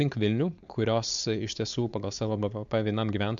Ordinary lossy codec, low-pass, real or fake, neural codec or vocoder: MP3, 64 kbps; 7.2 kHz; fake; codec, 16 kHz, 4.8 kbps, FACodec